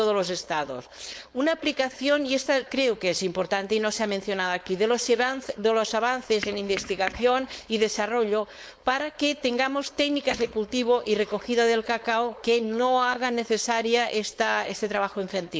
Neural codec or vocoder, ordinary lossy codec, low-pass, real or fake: codec, 16 kHz, 4.8 kbps, FACodec; none; none; fake